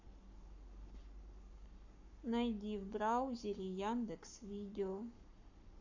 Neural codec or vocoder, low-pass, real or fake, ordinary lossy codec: codec, 44.1 kHz, 7.8 kbps, Pupu-Codec; 7.2 kHz; fake; none